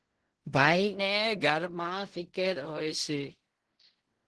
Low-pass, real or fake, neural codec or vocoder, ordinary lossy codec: 10.8 kHz; fake; codec, 16 kHz in and 24 kHz out, 0.4 kbps, LongCat-Audio-Codec, fine tuned four codebook decoder; Opus, 16 kbps